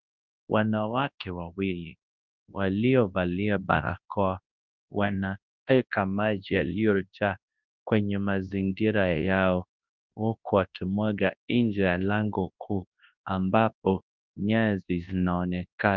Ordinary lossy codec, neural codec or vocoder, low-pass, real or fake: Opus, 32 kbps; codec, 24 kHz, 0.9 kbps, WavTokenizer, large speech release; 7.2 kHz; fake